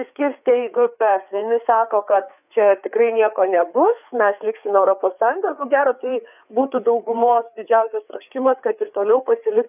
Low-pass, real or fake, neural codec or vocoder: 3.6 kHz; fake; codec, 16 kHz, 4 kbps, FreqCodec, larger model